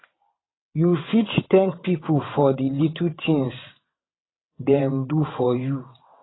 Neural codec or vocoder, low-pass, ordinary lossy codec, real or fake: vocoder, 22.05 kHz, 80 mel bands, WaveNeXt; 7.2 kHz; AAC, 16 kbps; fake